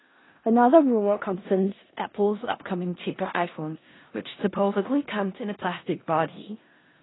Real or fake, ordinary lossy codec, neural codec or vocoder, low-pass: fake; AAC, 16 kbps; codec, 16 kHz in and 24 kHz out, 0.4 kbps, LongCat-Audio-Codec, four codebook decoder; 7.2 kHz